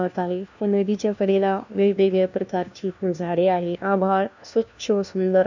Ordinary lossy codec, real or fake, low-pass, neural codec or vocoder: none; fake; 7.2 kHz; codec, 16 kHz, 1 kbps, FunCodec, trained on LibriTTS, 50 frames a second